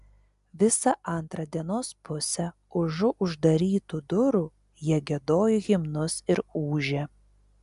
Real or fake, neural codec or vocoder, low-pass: real; none; 10.8 kHz